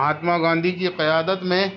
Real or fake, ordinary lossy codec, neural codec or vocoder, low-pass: real; none; none; 7.2 kHz